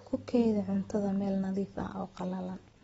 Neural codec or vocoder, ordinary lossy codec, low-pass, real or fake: none; AAC, 24 kbps; 9.9 kHz; real